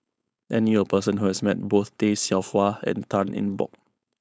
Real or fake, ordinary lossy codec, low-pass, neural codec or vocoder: fake; none; none; codec, 16 kHz, 4.8 kbps, FACodec